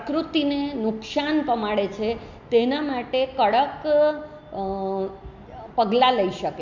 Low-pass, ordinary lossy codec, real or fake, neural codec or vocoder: 7.2 kHz; MP3, 64 kbps; real; none